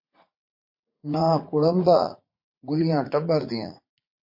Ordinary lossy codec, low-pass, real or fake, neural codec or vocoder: MP3, 32 kbps; 5.4 kHz; fake; vocoder, 44.1 kHz, 80 mel bands, Vocos